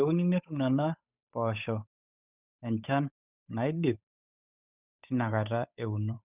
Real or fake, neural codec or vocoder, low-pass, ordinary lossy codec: fake; codec, 16 kHz, 8 kbps, FunCodec, trained on Chinese and English, 25 frames a second; 3.6 kHz; none